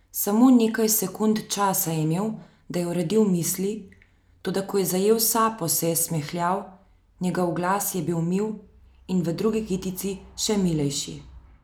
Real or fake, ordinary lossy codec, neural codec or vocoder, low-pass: real; none; none; none